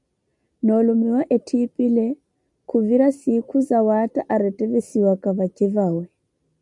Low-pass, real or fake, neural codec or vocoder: 10.8 kHz; real; none